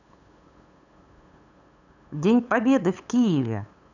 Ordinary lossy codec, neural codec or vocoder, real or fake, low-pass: none; codec, 16 kHz, 8 kbps, FunCodec, trained on LibriTTS, 25 frames a second; fake; 7.2 kHz